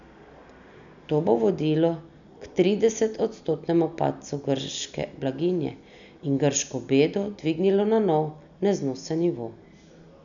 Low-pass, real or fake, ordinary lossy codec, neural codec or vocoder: 7.2 kHz; real; none; none